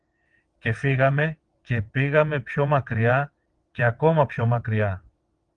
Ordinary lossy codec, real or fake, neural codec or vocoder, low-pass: Opus, 24 kbps; fake; vocoder, 22.05 kHz, 80 mel bands, WaveNeXt; 9.9 kHz